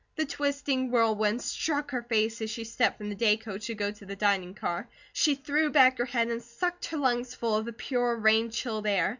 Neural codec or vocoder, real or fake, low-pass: none; real; 7.2 kHz